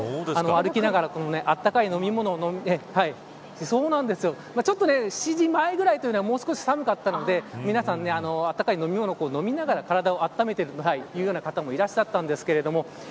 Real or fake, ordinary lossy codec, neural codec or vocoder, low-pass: real; none; none; none